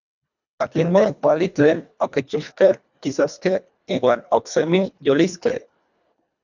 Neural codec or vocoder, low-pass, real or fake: codec, 24 kHz, 1.5 kbps, HILCodec; 7.2 kHz; fake